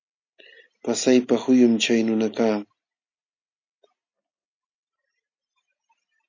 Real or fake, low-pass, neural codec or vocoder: real; 7.2 kHz; none